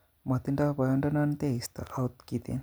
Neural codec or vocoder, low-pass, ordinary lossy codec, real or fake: none; none; none; real